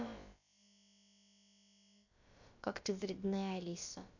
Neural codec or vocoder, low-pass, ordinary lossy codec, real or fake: codec, 16 kHz, about 1 kbps, DyCAST, with the encoder's durations; 7.2 kHz; none; fake